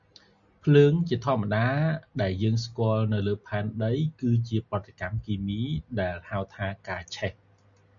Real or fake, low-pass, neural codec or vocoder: real; 7.2 kHz; none